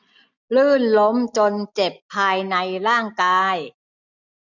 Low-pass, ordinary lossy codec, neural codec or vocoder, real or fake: 7.2 kHz; none; none; real